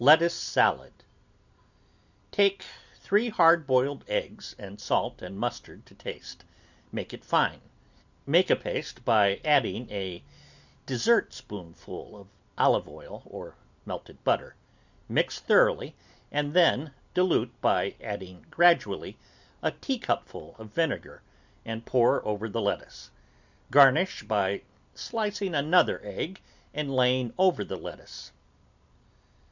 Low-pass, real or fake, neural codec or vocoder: 7.2 kHz; real; none